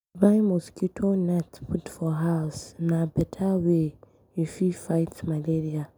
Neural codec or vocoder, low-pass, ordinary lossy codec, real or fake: none; none; none; real